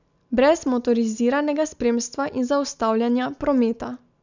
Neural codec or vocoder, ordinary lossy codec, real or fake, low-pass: none; none; real; 7.2 kHz